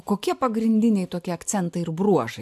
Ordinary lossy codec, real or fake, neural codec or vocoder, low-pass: MP3, 96 kbps; real; none; 14.4 kHz